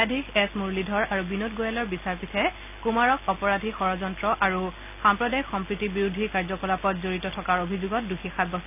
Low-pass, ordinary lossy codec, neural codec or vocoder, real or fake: 3.6 kHz; none; none; real